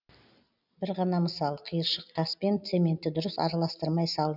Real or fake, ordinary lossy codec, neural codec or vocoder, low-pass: real; none; none; 5.4 kHz